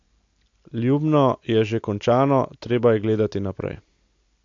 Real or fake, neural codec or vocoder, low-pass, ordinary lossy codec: real; none; 7.2 kHz; AAC, 48 kbps